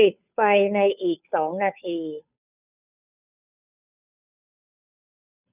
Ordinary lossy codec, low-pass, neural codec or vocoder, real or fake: none; 3.6 kHz; codec, 16 kHz, 2 kbps, FunCodec, trained on Chinese and English, 25 frames a second; fake